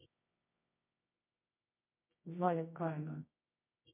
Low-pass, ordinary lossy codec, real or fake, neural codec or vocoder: 3.6 kHz; MP3, 24 kbps; fake; codec, 24 kHz, 0.9 kbps, WavTokenizer, medium music audio release